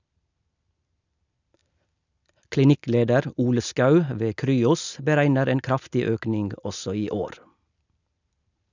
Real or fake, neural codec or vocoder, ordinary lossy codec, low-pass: real; none; none; 7.2 kHz